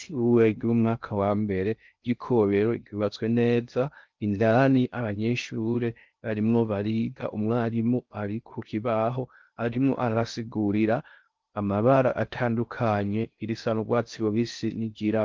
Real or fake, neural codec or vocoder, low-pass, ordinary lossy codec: fake; codec, 16 kHz in and 24 kHz out, 0.6 kbps, FocalCodec, streaming, 2048 codes; 7.2 kHz; Opus, 32 kbps